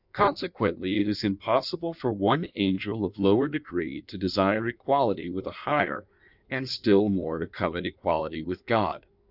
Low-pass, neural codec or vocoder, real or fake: 5.4 kHz; codec, 16 kHz in and 24 kHz out, 1.1 kbps, FireRedTTS-2 codec; fake